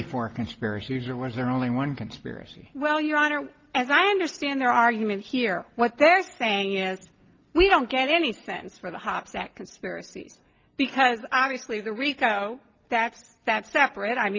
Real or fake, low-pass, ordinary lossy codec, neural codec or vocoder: real; 7.2 kHz; Opus, 24 kbps; none